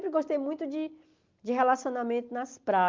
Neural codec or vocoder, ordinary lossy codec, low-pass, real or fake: none; Opus, 32 kbps; 7.2 kHz; real